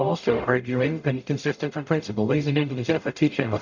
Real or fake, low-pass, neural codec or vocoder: fake; 7.2 kHz; codec, 44.1 kHz, 0.9 kbps, DAC